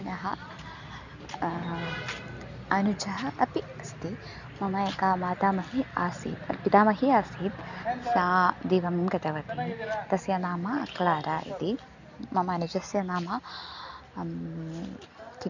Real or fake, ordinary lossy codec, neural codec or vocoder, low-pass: real; none; none; 7.2 kHz